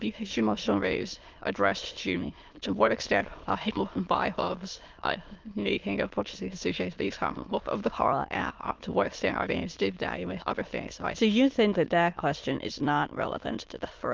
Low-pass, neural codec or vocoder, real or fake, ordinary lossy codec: 7.2 kHz; autoencoder, 22.05 kHz, a latent of 192 numbers a frame, VITS, trained on many speakers; fake; Opus, 32 kbps